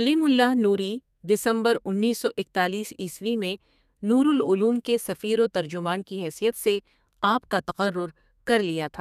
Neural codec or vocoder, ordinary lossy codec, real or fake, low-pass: codec, 32 kHz, 1.9 kbps, SNAC; none; fake; 14.4 kHz